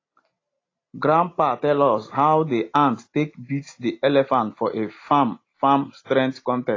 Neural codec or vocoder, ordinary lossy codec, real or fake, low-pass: none; AAC, 32 kbps; real; 7.2 kHz